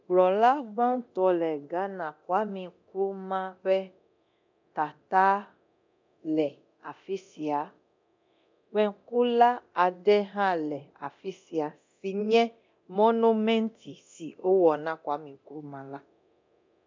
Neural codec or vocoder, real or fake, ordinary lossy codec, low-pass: codec, 24 kHz, 0.9 kbps, DualCodec; fake; MP3, 64 kbps; 7.2 kHz